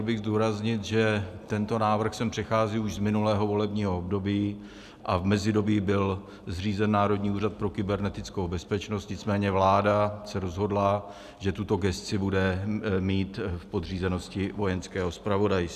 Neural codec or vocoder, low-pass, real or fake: none; 14.4 kHz; real